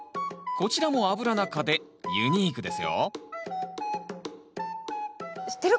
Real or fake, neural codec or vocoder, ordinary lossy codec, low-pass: real; none; none; none